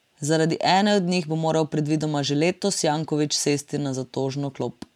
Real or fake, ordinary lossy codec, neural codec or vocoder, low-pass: fake; none; vocoder, 44.1 kHz, 128 mel bands every 512 samples, BigVGAN v2; 19.8 kHz